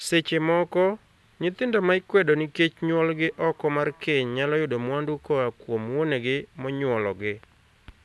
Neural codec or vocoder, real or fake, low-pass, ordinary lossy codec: none; real; none; none